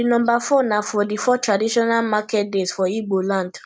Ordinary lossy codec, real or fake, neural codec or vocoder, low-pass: none; real; none; none